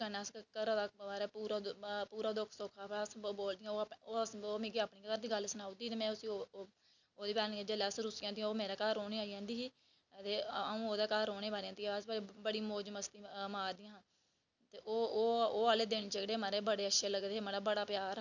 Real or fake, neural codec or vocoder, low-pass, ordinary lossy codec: real; none; 7.2 kHz; none